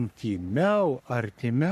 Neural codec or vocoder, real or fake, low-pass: codec, 44.1 kHz, 3.4 kbps, Pupu-Codec; fake; 14.4 kHz